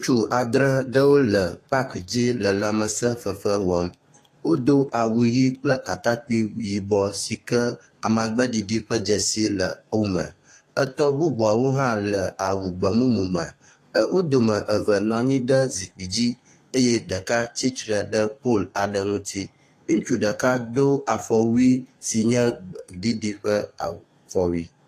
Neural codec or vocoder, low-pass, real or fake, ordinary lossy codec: codec, 32 kHz, 1.9 kbps, SNAC; 14.4 kHz; fake; AAC, 48 kbps